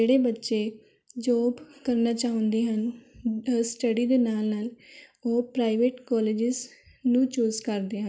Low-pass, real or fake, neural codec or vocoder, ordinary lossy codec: none; real; none; none